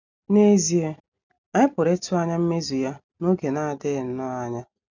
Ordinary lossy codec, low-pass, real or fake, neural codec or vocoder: none; 7.2 kHz; real; none